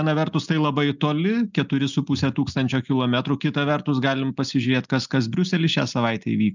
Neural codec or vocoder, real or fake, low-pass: none; real; 7.2 kHz